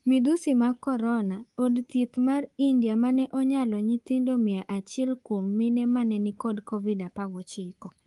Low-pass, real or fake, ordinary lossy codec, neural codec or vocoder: 10.8 kHz; fake; Opus, 24 kbps; codec, 24 kHz, 3.1 kbps, DualCodec